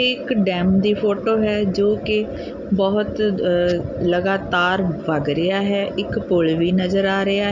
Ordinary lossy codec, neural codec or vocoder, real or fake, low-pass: none; none; real; 7.2 kHz